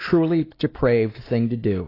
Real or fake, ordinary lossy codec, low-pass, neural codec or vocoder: real; AAC, 24 kbps; 5.4 kHz; none